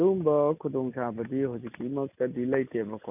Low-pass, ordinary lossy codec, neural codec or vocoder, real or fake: 3.6 kHz; none; none; real